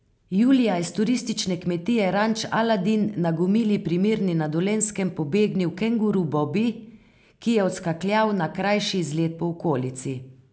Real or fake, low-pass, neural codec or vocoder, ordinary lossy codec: real; none; none; none